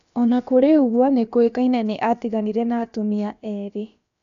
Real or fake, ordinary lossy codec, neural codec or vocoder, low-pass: fake; none; codec, 16 kHz, about 1 kbps, DyCAST, with the encoder's durations; 7.2 kHz